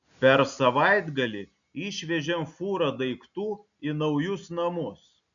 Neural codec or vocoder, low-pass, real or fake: none; 7.2 kHz; real